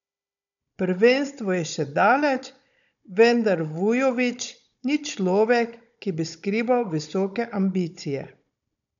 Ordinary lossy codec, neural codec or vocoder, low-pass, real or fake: none; codec, 16 kHz, 16 kbps, FunCodec, trained on Chinese and English, 50 frames a second; 7.2 kHz; fake